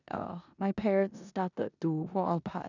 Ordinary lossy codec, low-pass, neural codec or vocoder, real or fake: none; 7.2 kHz; codec, 16 kHz in and 24 kHz out, 0.9 kbps, LongCat-Audio-Codec, four codebook decoder; fake